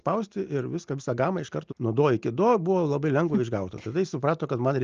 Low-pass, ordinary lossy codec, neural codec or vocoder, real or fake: 7.2 kHz; Opus, 24 kbps; none; real